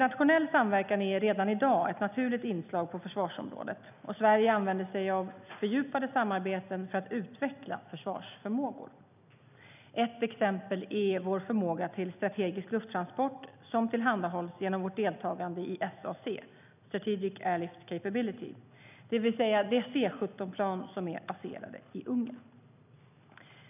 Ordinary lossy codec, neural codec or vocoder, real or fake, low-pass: none; none; real; 3.6 kHz